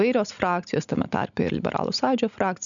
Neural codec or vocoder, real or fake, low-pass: none; real; 7.2 kHz